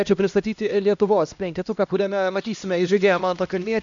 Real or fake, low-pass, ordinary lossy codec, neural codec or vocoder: fake; 7.2 kHz; MP3, 64 kbps; codec, 16 kHz, 1 kbps, X-Codec, HuBERT features, trained on LibriSpeech